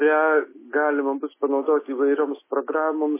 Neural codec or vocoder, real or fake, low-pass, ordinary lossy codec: none; real; 3.6 kHz; MP3, 16 kbps